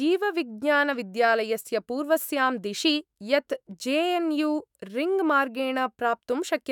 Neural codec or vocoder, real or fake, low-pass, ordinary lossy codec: autoencoder, 48 kHz, 128 numbers a frame, DAC-VAE, trained on Japanese speech; fake; 19.8 kHz; none